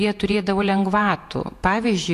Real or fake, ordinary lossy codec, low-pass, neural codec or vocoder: fake; AAC, 64 kbps; 14.4 kHz; vocoder, 48 kHz, 128 mel bands, Vocos